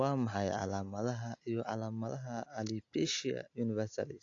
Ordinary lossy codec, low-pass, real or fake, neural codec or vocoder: AAC, 48 kbps; 7.2 kHz; real; none